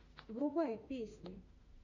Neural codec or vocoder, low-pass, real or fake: autoencoder, 48 kHz, 32 numbers a frame, DAC-VAE, trained on Japanese speech; 7.2 kHz; fake